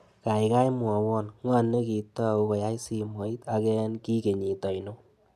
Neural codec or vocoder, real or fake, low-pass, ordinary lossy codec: none; real; 14.4 kHz; none